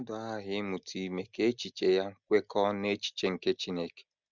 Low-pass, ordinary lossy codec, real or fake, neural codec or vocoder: 7.2 kHz; none; real; none